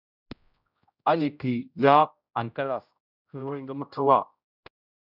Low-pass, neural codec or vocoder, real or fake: 5.4 kHz; codec, 16 kHz, 0.5 kbps, X-Codec, HuBERT features, trained on general audio; fake